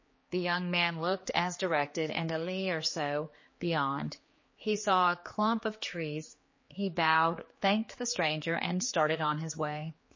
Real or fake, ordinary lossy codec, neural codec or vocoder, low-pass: fake; MP3, 32 kbps; codec, 16 kHz, 4 kbps, X-Codec, HuBERT features, trained on general audio; 7.2 kHz